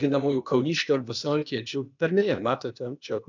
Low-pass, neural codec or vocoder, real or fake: 7.2 kHz; codec, 16 kHz, 0.8 kbps, ZipCodec; fake